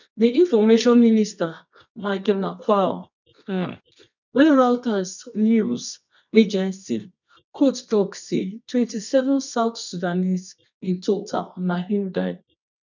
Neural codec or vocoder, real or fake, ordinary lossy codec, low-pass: codec, 24 kHz, 0.9 kbps, WavTokenizer, medium music audio release; fake; none; 7.2 kHz